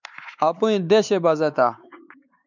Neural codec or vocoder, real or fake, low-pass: codec, 16 kHz, 4 kbps, X-Codec, WavLM features, trained on Multilingual LibriSpeech; fake; 7.2 kHz